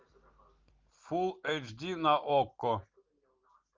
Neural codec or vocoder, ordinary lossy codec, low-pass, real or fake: none; Opus, 24 kbps; 7.2 kHz; real